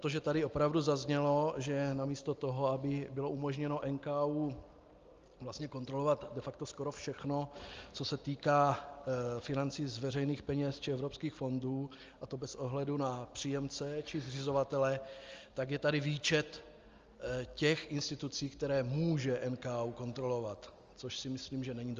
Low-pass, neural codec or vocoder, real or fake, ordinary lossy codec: 7.2 kHz; none; real; Opus, 32 kbps